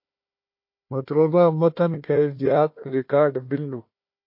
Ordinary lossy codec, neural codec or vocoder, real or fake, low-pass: MP3, 32 kbps; codec, 16 kHz, 1 kbps, FunCodec, trained on Chinese and English, 50 frames a second; fake; 5.4 kHz